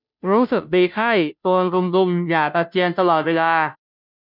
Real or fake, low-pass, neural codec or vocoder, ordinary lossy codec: fake; 5.4 kHz; codec, 16 kHz, 0.5 kbps, FunCodec, trained on Chinese and English, 25 frames a second; none